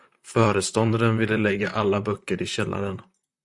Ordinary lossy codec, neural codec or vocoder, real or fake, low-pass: Opus, 64 kbps; vocoder, 44.1 kHz, 128 mel bands, Pupu-Vocoder; fake; 10.8 kHz